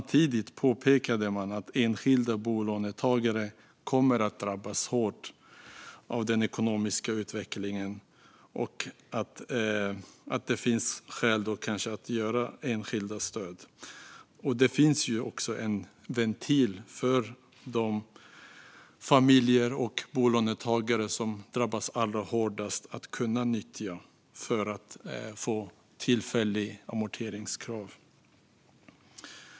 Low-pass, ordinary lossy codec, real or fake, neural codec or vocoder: none; none; real; none